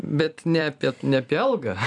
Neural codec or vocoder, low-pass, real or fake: vocoder, 44.1 kHz, 128 mel bands every 256 samples, BigVGAN v2; 10.8 kHz; fake